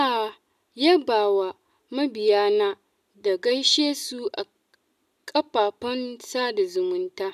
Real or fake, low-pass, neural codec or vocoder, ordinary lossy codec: real; 14.4 kHz; none; none